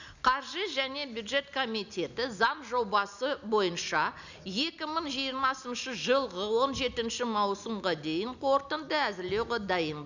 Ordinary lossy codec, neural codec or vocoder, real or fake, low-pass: none; none; real; 7.2 kHz